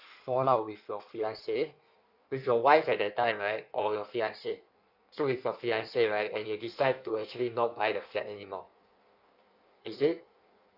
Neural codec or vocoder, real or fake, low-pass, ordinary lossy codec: codec, 16 kHz in and 24 kHz out, 1.1 kbps, FireRedTTS-2 codec; fake; 5.4 kHz; none